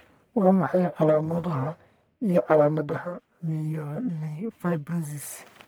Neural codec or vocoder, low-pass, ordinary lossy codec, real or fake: codec, 44.1 kHz, 1.7 kbps, Pupu-Codec; none; none; fake